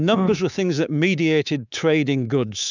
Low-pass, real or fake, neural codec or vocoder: 7.2 kHz; fake; codec, 24 kHz, 3.1 kbps, DualCodec